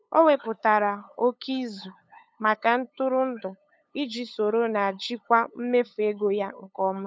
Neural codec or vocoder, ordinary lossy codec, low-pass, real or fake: codec, 16 kHz, 8 kbps, FunCodec, trained on LibriTTS, 25 frames a second; none; none; fake